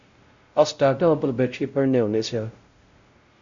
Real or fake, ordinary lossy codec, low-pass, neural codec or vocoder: fake; Opus, 64 kbps; 7.2 kHz; codec, 16 kHz, 0.5 kbps, X-Codec, WavLM features, trained on Multilingual LibriSpeech